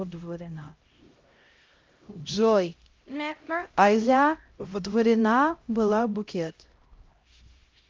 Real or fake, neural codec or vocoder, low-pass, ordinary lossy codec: fake; codec, 16 kHz, 0.5 kbps, X-Codec, HuBERT features, trained on LibriSpeech; 7.2 kHz; Opus, 32 kbps